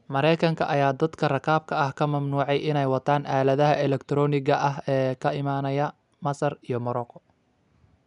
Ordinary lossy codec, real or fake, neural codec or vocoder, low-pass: none; real; none; 10.8 kHz